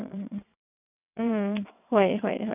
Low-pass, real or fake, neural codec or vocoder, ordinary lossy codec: 3.6 kHz; fake; vocoder, 22.05 kHz, 80 mel bands, WaveNeXt; none